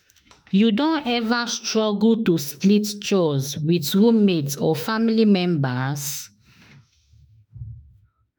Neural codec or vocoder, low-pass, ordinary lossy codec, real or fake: autoencoder, 48 kHz, 32 numbers a frame, DAC-VAE, trained on Japanese speech; none; none; fake